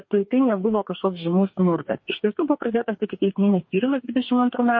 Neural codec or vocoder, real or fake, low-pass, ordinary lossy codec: codec, 44.1 kHz, 2.6 kbps, DAC; fake; 7.2 kHz; MP3, 32 kbps